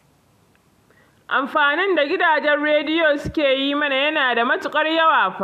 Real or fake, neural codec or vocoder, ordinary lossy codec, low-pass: real; none; none; 14.4 kHz